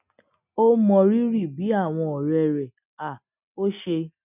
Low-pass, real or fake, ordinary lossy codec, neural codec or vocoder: 3.6 kHz; real; none; none